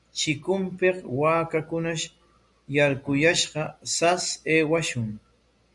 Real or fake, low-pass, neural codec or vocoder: real; 10.8 kHz; none